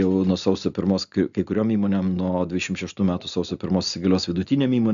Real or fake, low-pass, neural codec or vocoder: real; 7.2 kHz; none